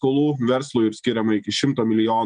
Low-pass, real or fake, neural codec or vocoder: 9.9 kHz; real; none